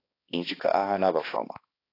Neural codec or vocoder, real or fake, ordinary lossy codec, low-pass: codec, 16 kHz, 2 kbps, X-Codec, HuBERT features, trained on balanced general audio; fake; AAC, 24 kbps; 5.4 kHz